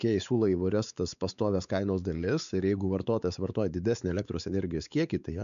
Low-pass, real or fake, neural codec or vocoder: 7.2 kHz; fake; codec, 16 kHz, 4 kbps, X-Codec, WavLM features, trained on Multilingual LibriSpeech